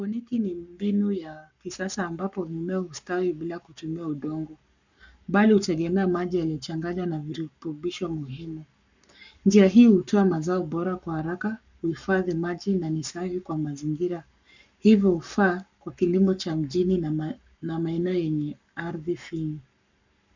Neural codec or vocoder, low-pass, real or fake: codec, 44.1 kHz, 7.8 kbps, Pupu-Codec; 7.2 kHz; fake